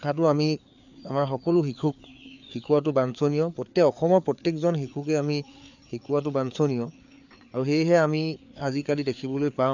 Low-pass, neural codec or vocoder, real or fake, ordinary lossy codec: 7.2 kHz; codec, 16 kHz, 4 kbps, FreqCodec, larger model; fake; none